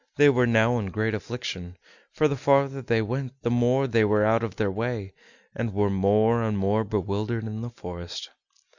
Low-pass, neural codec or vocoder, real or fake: 7.2 kHz; none; real